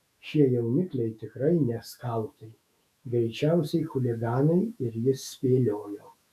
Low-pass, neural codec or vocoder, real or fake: 14.4 kHz; autoencoder, 48 kHz, 128 numbers a frame, DAC-VAE, trained on Japanese speech; fake